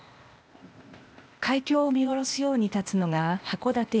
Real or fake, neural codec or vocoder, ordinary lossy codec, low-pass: fake; codec, 16 kHz, 0.8 kbps, ZipCodec; none; none